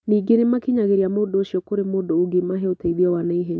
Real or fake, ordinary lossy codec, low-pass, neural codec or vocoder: real; none; none; none